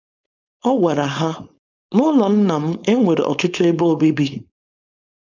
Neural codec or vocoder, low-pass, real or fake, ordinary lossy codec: codec, 16 kHz, 4.8 kbps, FACodec; 7.2 kHz; fake; none